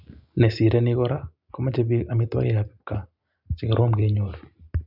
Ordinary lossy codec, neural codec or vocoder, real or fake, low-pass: none; none; real; 5.4 kHz